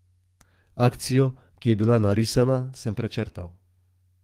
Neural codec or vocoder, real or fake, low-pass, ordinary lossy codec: codec, 32 kHz, 1.9 kbps, SNAC; fake; 14.4 kHz; Opus, 24 kbps